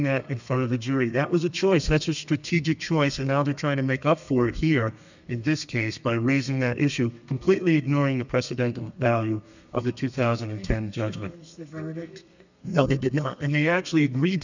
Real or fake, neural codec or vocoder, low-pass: fake; codec, 32 kHz, 1.9 kbps, SNAC; 7.2 kHz